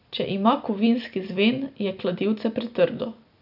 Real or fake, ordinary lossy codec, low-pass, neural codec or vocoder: real; none; 5.4 kHz; none